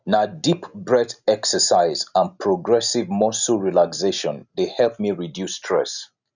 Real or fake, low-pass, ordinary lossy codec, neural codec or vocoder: real; 7.2 kHz; none; none